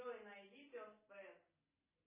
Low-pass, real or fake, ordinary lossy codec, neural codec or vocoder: 3.6 kHz; real; MP3, 16 kbps; none